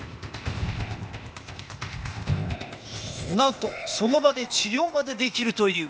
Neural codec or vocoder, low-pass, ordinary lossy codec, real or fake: codec, 16 kHz, 0.8 kbps, ZipCodec; none; none; fake